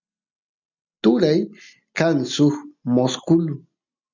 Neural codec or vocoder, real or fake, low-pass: none; real; 7.2 kHz